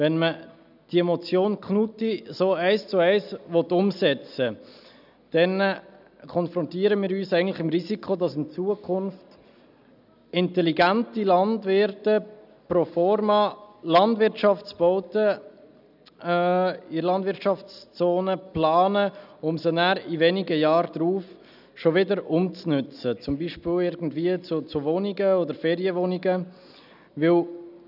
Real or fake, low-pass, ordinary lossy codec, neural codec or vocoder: real; 5.4 kHz; none; none